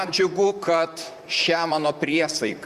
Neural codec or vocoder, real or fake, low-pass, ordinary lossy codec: vocoder, 44.1 kHz, 128 mel bands, Pupu-Vocoder; fake; 14.4 kHz; Opus, 64 kbps